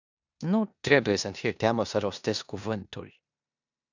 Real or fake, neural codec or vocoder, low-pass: fake; codec, 16 kHz in and 24 kHz out, 0.9 kbps, LongCat-Audio-Codec, four codebook decoder; 7.2 kHz